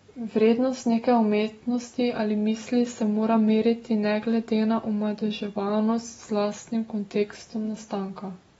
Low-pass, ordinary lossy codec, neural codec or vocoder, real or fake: 19.8 kHz; AAC, 24 kbps; none; real